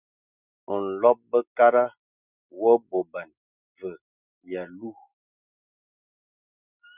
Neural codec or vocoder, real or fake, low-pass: none; real; 3.6 kHz